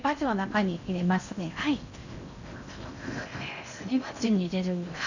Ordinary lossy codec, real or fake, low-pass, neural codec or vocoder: none; fake; 7.2 kHz; codec, 16 kHz in and 24 kHz out, 0.6 kbps, FocalCodec, streaming, 2048 codes